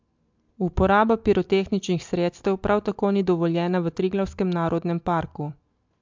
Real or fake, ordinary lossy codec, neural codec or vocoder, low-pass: real; MP3, 64 kbps; none; 7.2 kHz